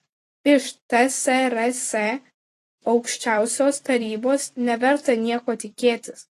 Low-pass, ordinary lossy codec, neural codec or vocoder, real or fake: 14.4 kHz; AAC, 48 kbps; vocoder, 44.1 kHz, 128 mel bands every 256 samples, BigVGAN v2; fake